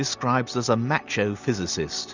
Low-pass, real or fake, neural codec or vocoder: 7.2 kHz; real; none